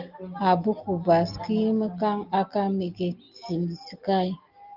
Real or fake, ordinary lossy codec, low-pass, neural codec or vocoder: real; Opus, 32 kbps; 5.4 kHz; none